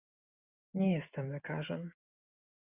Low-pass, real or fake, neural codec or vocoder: 3.6 kHz; real; none